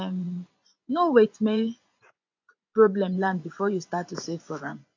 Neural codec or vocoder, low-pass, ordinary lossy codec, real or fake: vocoder, 24 kHz, 100 mel bands, Vocos; 7.2 kHz; AAC, 48 kbps; fake